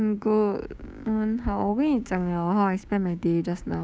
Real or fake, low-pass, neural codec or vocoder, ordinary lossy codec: fake; none; codec, 16 kHz, 6 kbps, DAC; none